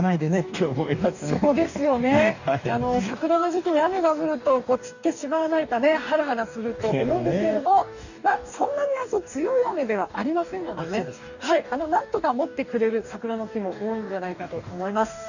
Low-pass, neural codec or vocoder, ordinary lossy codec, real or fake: 7.2 kHz; codec, 44.1 kHz, 2.6 kbps, DAC; none; fake